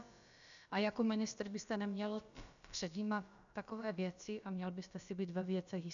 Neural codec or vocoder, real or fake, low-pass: codec, 16 kHz, about 1 kbps, DyCAST, with the encoder's durations; fake; 7.2 kHz